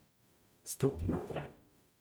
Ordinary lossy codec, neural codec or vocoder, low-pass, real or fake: none; codec, 44.1 kHz, 0.9 kbps, DAC; none; fake